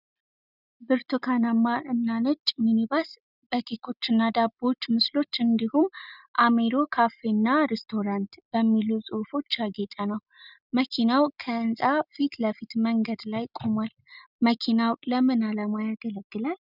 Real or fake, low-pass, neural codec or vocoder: real; 5.4 kHz; none